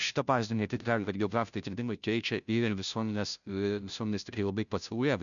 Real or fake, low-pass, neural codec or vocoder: fake; 7.2 kHz; codec, 16 kHz, 0.5 kbps, FunCodec, trained on Chinese and English, 25 frames a second